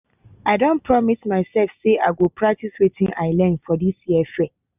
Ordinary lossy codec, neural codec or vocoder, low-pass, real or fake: none; none; 3.6 kHz; real